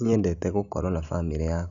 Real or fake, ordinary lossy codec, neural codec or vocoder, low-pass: real; none; none; 7.2 kHz